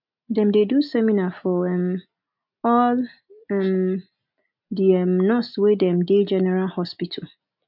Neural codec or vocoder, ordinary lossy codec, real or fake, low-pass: none; none; real; 5.4 kHz